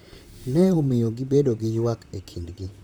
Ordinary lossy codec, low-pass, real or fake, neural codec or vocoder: none; none; fake; vocoder, 44.1 kHz, 128 mel bands, Pupu-Vocoder